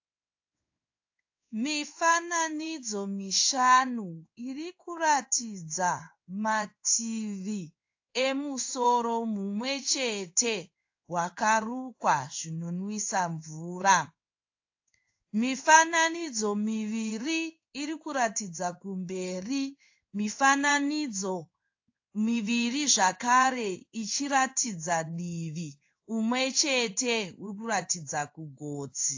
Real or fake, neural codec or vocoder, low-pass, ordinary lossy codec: fake; codec, 16 kHz in and 24 kHz out, 1 kbps, XY-Tokenizer; 7.2 kHz; AAC, 48 kbps